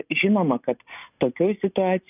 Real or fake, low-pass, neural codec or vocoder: real; 3.6 kHz; none